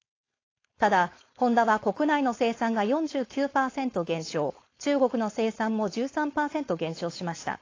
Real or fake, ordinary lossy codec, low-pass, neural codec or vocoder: fake; AAC, 32 kbps; 7.2 kHz; codec, 16 kHz, 4.8 kbps, FACodec